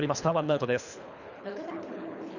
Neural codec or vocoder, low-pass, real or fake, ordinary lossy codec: codec, 24 kHz, 3 kbps, HILCodec; 7.2 kHz; fake; none